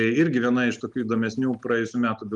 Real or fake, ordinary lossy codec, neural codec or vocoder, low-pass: real; Opus, 32 kbps; none; 10.8 kHz